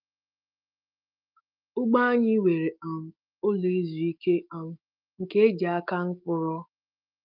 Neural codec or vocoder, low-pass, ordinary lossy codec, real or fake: autoencoder, 48 kHz, 128 numbers a frame, DAC-VAE, trained on Japanese speech; 5.4 kHz; Opus, 24 kbps; fake